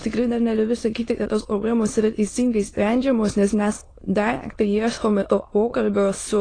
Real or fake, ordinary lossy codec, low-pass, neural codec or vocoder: fake; AAC, 32 kbps; 9.9 kHz; autoencoder, 22.05 kHz, a latent of 192 numbers a frame, VITS, trained on many speakers